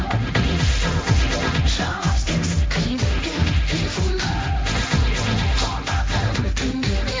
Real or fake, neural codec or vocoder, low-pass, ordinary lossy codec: fake; codec, 16 kHz, 1.1 kbps, Voila-Tokenizer; none; none